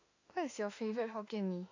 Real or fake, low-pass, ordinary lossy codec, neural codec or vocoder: fake; 7.2 kHz; AAC, 48 kbps; autoencoder, 48 kHz, 32 numbers a frame, DAC-VAE, trained on Japanese speech